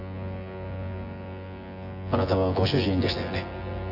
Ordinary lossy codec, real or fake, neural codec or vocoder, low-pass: none; fake; vocoder, 24 kHz, 100 mel bands, Vocos; 5.4 kHz